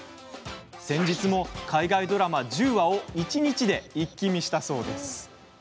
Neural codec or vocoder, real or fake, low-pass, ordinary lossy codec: none; real; none; none